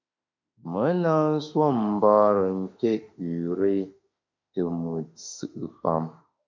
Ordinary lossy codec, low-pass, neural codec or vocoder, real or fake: MP3, 64 kbps; 7.2 kHz; autoencoder, 48 kHz, 32 numbers a frame, DAC-VAE, trained on Japanese speech; fake